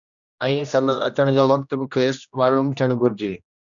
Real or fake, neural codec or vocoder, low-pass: fake; codec, 16 kHz, 1 kbps, X-Codec, HuBERT features, trained on general audio; 7.2 kHz